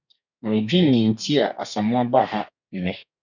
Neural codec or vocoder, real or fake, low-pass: codec, 32 kHz, 1.9 kbps, SNAC; fake; 7.2 kHz